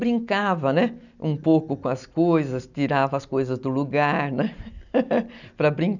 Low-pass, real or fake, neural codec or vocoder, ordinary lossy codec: 7.2 kHz; real; none; none